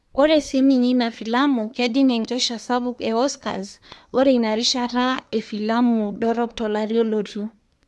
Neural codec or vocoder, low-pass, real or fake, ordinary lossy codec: codec, 24 kHz, 1 kbps, SNAC; none; fake; none